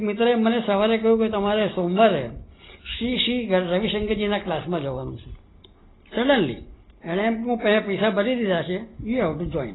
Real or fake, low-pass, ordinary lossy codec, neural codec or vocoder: real; 7.2 kHz; AAC, 16 kbps; none